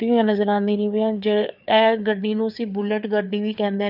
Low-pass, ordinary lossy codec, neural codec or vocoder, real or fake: 5.4 kHz; none; codec, 16 kHz, 4 kbps, FreqCodec, larger model; fake